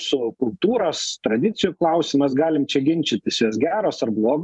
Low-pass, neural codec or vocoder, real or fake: 10.8 kHz; vocoder, 44.1 kHz, 128 mel bands every 512 samples, BigVGAN v2; fake